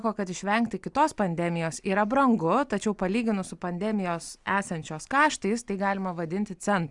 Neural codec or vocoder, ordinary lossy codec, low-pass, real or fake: none; Opus, 64 kbps; 10.8 kHz; real